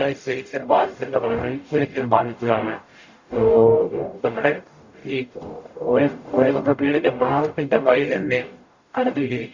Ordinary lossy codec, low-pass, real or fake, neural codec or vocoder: Opus, 64 kbps; 7.2 kHz; fake; codec, 44.1 kHz, 0.9 kbps, DAC